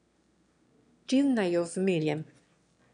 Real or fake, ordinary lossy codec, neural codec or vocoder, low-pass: fake; none; autoencoder, 22.05 kHz, a latent of 192 numbers a frame, VITS, trained on one speaker; 9.9 kHz